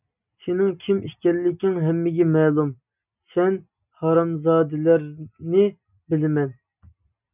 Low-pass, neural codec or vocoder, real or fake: 3.6 kHz; none; real